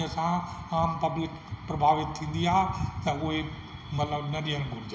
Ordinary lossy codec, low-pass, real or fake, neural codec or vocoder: none; none; real; none